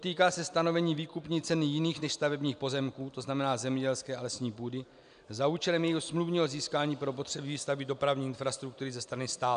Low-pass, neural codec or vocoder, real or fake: 9.9 kHz; none; real